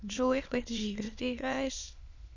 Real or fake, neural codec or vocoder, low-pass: fake; autoencoder, 22.05 kHz, a latent of 192 numbers a frame, VITS, trained on many speakers; 7.2 kHz